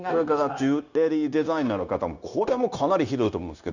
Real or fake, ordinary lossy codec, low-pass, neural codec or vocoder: fake; none; 7.2 kHz; codec, 16 kHz, 0.9 kbps, LongCat-Audio-Codec